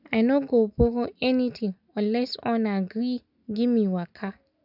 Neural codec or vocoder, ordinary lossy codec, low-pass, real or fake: none; none; 5.4 kHz; real